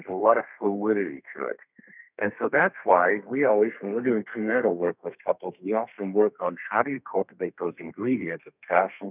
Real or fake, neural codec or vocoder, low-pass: fake; codec, 32 kHz, 1.9 kbps, SNAC; 3.6 kHz